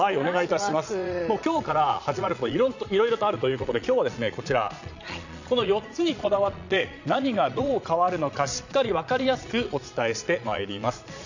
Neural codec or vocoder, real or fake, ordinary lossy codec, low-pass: vocoder, 22.05 kHz, 80 mel bands, Vocos; fake; none; 7.2 kHz